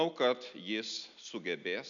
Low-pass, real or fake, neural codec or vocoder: 7.2 kHz; real; none